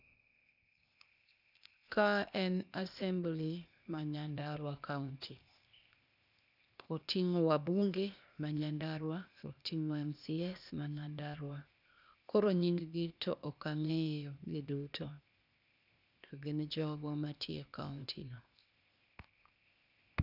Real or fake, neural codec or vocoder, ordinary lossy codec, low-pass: fake; codec, 16 kHz, 0.8 kbps, ZipCodec; none; 5.4 kHz